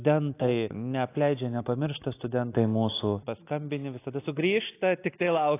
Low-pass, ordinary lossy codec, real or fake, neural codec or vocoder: 3.6 kHz; AAC, 24 kbps; real; none